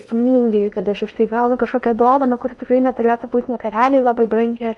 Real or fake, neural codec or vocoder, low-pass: fake; codec, 16 kHz in and 24 kHz out, 0.8 kbps, FocalCodec, streaming, 65536 codes; 10.8 kHz